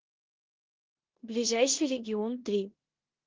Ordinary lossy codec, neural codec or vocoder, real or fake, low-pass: Opus, 16 kbps; codec, 16 kHz in and 24 kHz out, 0.9 kbps, LongCat-Audio-Codec, four codebook decoder; fake; 7.2 kHz